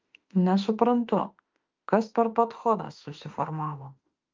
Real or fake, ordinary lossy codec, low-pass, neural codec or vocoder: fake; Opus, 24 kbps; 7.2 kHz; autoencoder, 48 kHz, 32 numbers a frame, DAC-VAE, trained on Japanese speech